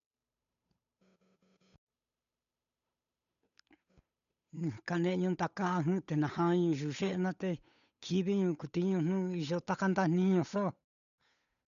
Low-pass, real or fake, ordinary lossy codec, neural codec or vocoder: 7.2 kHz; fake; none; codec, 16 kHz, 8 kbps, FunCodec, trained on Chinese and English, 25 frames a second